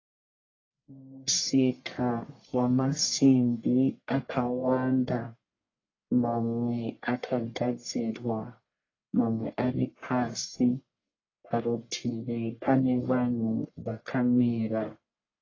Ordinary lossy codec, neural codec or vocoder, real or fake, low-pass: AAC, 32 kbps; codec, 44.1 kHz, 1.7 kbps, Pupu-Codec; fake; 7.2 kHz